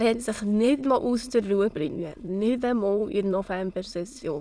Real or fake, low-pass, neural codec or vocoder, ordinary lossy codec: fake; none; autoencoder, 22.05 kHz, a latent of 192 numbers a frame, VITS, trained on many speakers; none